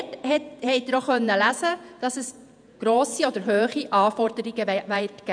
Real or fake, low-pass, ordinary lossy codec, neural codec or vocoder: fake; 9.9 kHz; none; vocoder, 48 kHz, 128 mel bands, Vocos